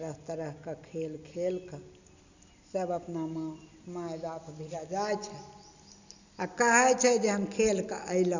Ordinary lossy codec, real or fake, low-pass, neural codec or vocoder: none; real; 7.2 kHz; none